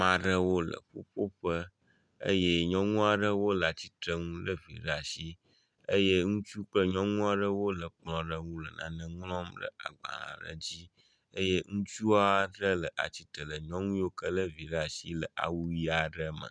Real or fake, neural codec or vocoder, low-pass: real; none; 9.9 kHz